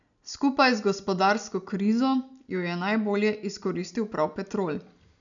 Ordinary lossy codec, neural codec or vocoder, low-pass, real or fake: MP3, 96 kbps; none; 7.2 kHz; real